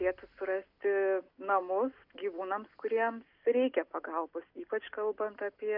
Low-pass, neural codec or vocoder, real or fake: 5.4 kHz; none; real